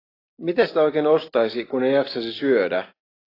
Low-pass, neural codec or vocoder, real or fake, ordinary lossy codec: 5.4 kHz; none; real; AAC, 24 kbps